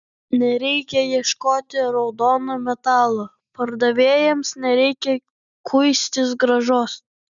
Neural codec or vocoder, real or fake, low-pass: none; real; 7.2 kHz